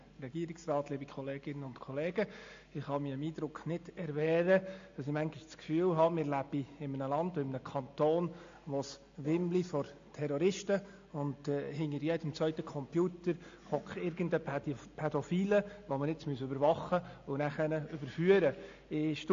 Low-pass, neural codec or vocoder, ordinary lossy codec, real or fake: 7.2 kHz; none; AAC, 48 kbps; real